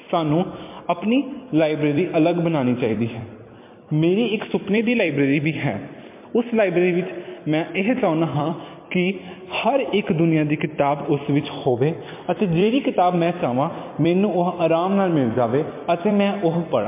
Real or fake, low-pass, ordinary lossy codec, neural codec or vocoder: fake; 3.6 kHz; MP3, 24 kbps; vocoder, 44.1 kHz, 128 mel bands every 256 samples, BigVGAN v2